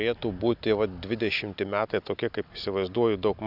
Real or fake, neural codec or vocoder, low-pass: real; none; 5.4 kHz